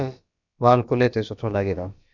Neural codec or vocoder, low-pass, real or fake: codec, 16 kHz, about 1 kbps, DyCAST, with the encoder's durations; 7.2 kHz; fake